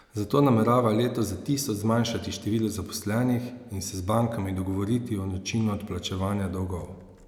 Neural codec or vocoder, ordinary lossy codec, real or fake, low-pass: none; none; real; 19.8 kHz